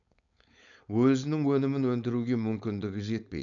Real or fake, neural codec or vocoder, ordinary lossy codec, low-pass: fake; codec, 16 kHz, 4.8 kbps, FACodec; none; 7.2 kHz